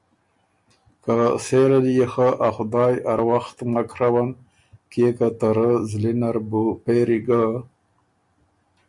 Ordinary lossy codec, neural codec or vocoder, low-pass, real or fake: MP3, 64 kbps; none; 10.8 kHz; real